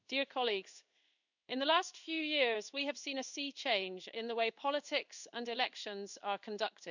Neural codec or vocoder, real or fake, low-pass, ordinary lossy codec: codec, 16 kHz in and 24 kHz out, 1 kbps, XY-Tokenizer; fake; 7.2 kHz; none